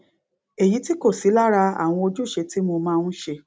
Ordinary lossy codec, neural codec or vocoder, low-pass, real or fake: none; none; none; real